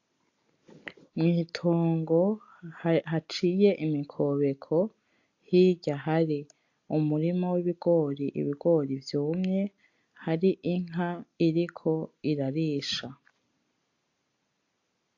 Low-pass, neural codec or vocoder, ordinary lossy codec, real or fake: 7.2 kHz; none; AAC, 48 kbps; real